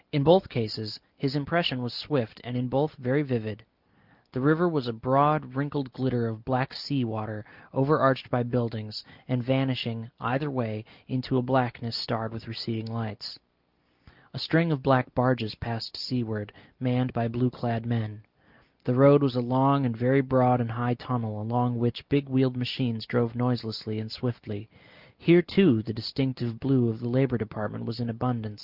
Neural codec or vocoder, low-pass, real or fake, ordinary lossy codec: none; 5.4 kHz; real; Opus, 24 kbps